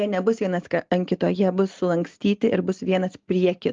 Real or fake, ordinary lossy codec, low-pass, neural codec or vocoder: real; Opus, 32 kbps; 7.2 kHz; none